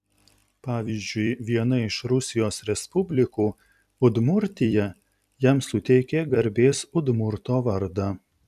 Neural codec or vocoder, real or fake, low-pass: vocoder, 44.1 kHz, 128 mel bands every 256 samples, BigVGAN v2; fake; 14.4 kHz